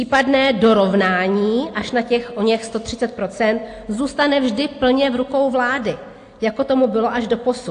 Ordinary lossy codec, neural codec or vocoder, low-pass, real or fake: AAC, 48 kbps; none; 9.9 kHz; real